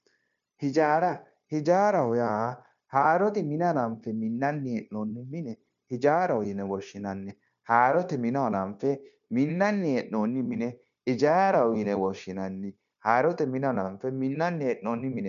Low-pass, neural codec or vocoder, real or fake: 7.2 kHz; codec, 16 kHz, 0.9 kbps, LongCat-Audio-Codec; fake